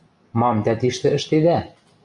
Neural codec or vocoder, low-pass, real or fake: none; 10.8 kHz; real